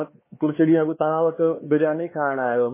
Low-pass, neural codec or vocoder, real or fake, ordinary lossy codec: 3.6 kHz; codec, 16 kHz, 4 kbps, X-Codec, HuBERT features, trained on LibriSpeech; fake; MP3, 16 kbps